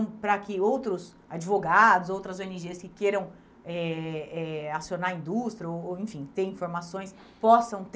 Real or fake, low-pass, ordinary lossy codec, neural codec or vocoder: real; none; none; none